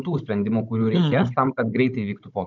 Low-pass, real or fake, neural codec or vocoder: 7.2 kHz; fake; codec, 16 kHz, 16 kbps, FunCodec, trained on Chinese and English, 50 frames a second